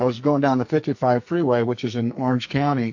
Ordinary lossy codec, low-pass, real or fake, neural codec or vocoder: MP3, 48 kbps; 7.2 kHz; fake; codec, 44.1 kHz, 2.6 kbps, SNAC